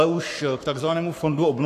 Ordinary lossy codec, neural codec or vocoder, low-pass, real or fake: AAC, 64 kbps; codec, 44.1 kHz, 7.8 kbps, Pupu-Codec; 14.4 kHz; fake